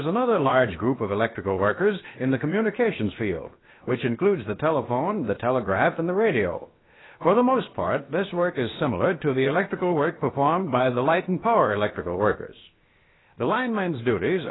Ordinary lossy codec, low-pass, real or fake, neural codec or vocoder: AAC, 16 kbps; 7.2 kHz; fake; codec, 16 kHz, about 1 kbps, DyCAST, with the encoder's durations